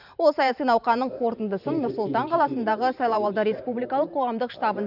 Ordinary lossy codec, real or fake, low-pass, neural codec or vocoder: none; fake; 5.4 kHz; vocoder, 44.1 kHz, 128 mel bands every 512 samples, BigVGAN v2